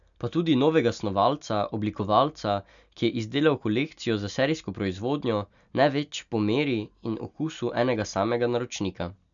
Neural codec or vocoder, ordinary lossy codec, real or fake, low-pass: none; none; real; 7.2 kHz